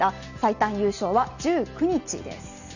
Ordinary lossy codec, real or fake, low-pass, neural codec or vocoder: none; real; 7.2 kHz; none